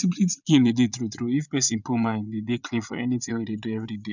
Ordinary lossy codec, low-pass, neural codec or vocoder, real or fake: none; 7.2 kHz; vocoder, 22.05 kHz, 80 mel bands, Vocos; fake